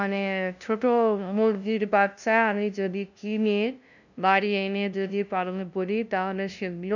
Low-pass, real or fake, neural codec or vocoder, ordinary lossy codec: 7.2 kHz; fake; codec, 16 kHz, 0.5 kbps, FunCodec, trained on LibriTTS, 25 frames a second; none